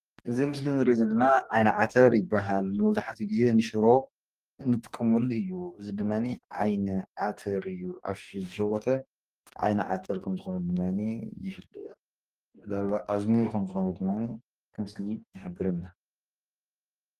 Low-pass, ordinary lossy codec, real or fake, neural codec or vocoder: 14.4 kHz; Opus, 32 kbps; fake; codec, 44.1 kHz, 2.6 kbps, DAC